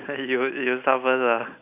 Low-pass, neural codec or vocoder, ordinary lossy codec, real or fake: 3.6 kHz; none; none; real